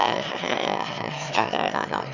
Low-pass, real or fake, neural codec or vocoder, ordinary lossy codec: 7.2 kHz; fake; autoencoder, 22.05 kHz, a latent of 192 numbers a frame, VITS, trained on one speaker; none